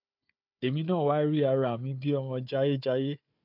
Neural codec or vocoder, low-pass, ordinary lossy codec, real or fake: codec, 16 kHz, 4 kbps, FunCodec, trained on Chinese and English, 50 frames a second; 5.4 kHz; MP3, 48 kbps; fake